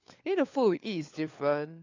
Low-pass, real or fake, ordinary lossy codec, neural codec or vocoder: 7.2 kHz; fake; AAC, 48 kbps; codec, 16 kHz, 4 kbps, FunCodec, trained on LibriTTS, 50 frames a second